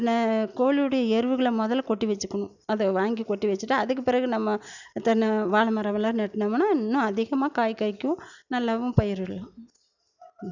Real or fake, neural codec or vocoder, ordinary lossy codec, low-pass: real; none; none; 7.2 kHz